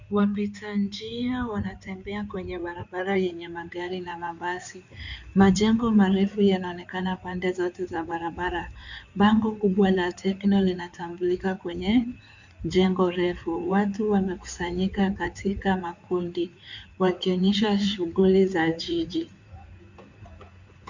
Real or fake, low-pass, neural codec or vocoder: fake; 7.2 kHz; codec, 16 kHz in and 24 kHz out, 2.2 kbps, FireRedTTS-2 codec